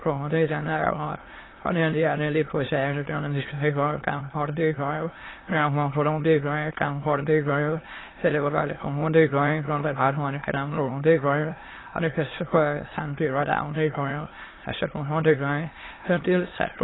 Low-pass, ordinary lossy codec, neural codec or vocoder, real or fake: 7.2 kHz; AAC, 16 kbps; autoencoder, 22.05 kHz, a latent of 192 numbers a frame, VITS, trained on many speakers; fake